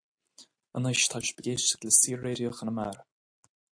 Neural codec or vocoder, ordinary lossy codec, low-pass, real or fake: none; AAC, 64 kbps; 9.9 kHz; real